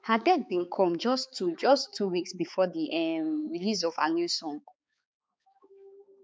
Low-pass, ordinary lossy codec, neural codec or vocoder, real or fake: none; none; codec, 16 kHz, 4 kbps, X-Codec, HuBERT features, trained on balanced general audio; fake